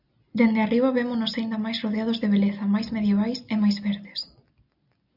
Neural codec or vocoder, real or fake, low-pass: none; real; 5.4 kHz